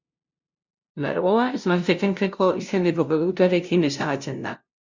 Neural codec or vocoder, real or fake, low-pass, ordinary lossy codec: codec, 16 kHz, 0.5 kbps, FunCodec, trained on LibriTTS, 25 frames a second; fake; 7.2 kHz; Opus, 64 kbps